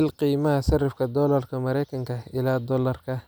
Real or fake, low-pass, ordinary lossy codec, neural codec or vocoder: real; none; none; none